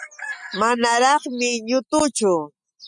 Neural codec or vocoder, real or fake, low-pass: none; real; 10.8 kHz